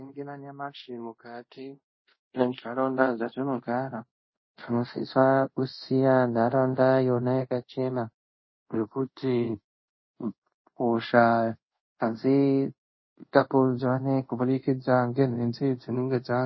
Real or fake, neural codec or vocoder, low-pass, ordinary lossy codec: fake; codec, 24 kHz, 0.5 kbps, DualCodec; 7.2 kHz; MP3, 24 kbps